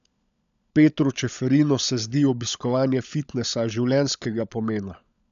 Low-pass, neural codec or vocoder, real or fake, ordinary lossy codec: 7.2 kHz; codec, 16 kHz, 16 kbps, FunCodec, trained on LibriTTS, 50 frames a second; fake; none